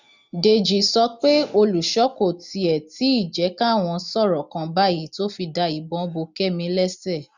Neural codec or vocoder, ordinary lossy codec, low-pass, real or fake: none; none; 7.2 kHz; real